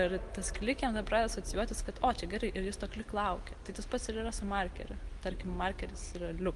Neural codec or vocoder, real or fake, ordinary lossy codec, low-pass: none; real; Opus, 24 kbps; 10.8 kHz